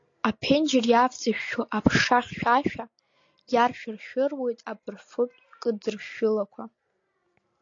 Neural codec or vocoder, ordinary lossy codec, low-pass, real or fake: none; AAC, 48 kbps; 7.2 kHz; real